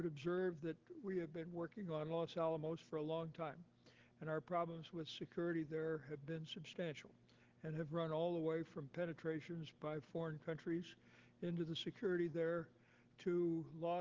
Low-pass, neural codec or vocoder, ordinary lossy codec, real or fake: 7.2 kHz; none; Opus, 16 kbps; real